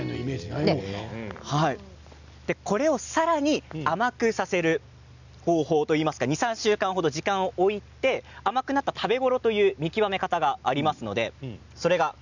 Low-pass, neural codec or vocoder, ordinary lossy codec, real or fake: 7.2 kHz; none; none; real